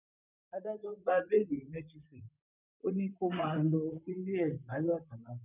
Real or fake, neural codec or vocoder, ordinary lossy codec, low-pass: fake; vocoder, 44.1 kHz, 128 mel bands, Pupu-Vocoder; none; 3.6 kHz